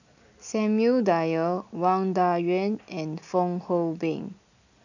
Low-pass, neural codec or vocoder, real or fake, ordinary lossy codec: 7.2 kHz; none; real; none